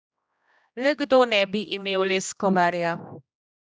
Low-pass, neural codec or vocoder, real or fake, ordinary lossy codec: none; codec, 16 kHz, 1 kbps, X-Codec, HuBERT features, trained on general audio; fake; none